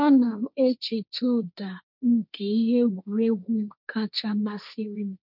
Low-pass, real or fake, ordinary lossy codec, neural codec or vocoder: 5.4 kHz; fake; none; codec, 16 kHz, 1.1 kbps, Voila-Tokenizer